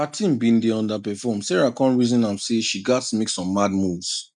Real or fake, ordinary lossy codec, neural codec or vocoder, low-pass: real; none; none; 10.8 kHz